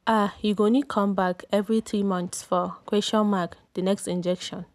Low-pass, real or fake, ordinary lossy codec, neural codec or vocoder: none; real; none; none